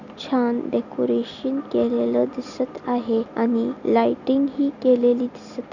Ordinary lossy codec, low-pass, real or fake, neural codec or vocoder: none; 7.2 kHz; real; none